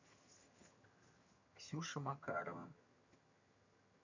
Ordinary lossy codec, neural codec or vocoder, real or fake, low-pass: none; vocoder, 22.05 kHz, 80 mel bands, HiFi-GAN; fake; 7.2 kHz